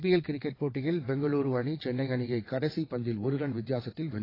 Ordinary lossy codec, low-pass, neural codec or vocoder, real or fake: AAC, 24 kbps; 5.4 kHz; vocoder, 22.05 kHz, 80 mel bands, WaveNeXt; fake